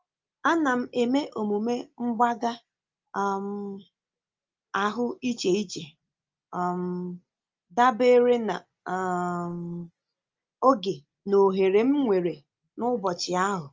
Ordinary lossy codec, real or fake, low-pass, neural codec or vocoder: Opus, 24 kbps; real; 7.2 kHz; none